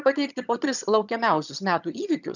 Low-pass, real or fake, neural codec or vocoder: 7.2 kHz; fake; vocoder, 22.05 kHz, 80 mel bands, HiFi-GAN